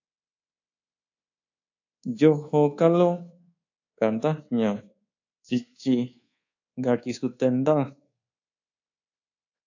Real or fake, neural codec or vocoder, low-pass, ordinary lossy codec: fake; codec, 24 kHz, 1.2 kbps, DualCodec; 7.2 kHz; AAC, 48 kbps